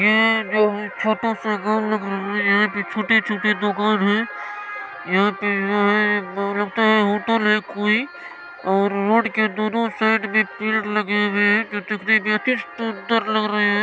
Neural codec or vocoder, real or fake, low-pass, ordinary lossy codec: none; real; none; none